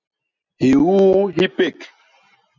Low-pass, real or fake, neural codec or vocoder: 7.2 kHz; real; none